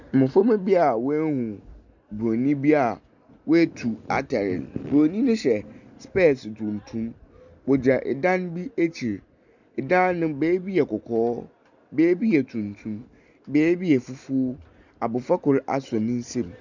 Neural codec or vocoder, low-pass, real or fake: none; 7.2 kHz; real